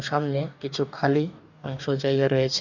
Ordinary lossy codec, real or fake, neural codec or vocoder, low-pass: none; fake; codec, 44.1 kHz, 2.6 kbps, DAC; 7.2 kHz